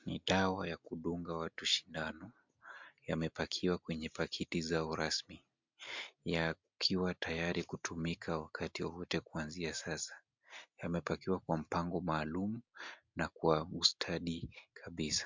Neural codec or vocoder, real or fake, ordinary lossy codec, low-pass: none; real; MP3, 64 kbps; 7.2 kHz